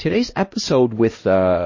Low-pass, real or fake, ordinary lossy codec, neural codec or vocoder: 7.2 kHz; fake; MP3, 32 kbps; codec, 16 kHz, 1 kbps, X-Codec, HuBERT features, trained on LibriSpeech